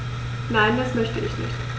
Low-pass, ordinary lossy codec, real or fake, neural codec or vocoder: none; none; real; none